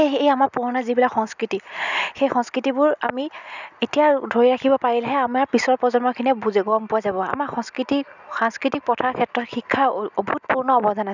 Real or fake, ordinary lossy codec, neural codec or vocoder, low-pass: real; none; none; 7.2 kHz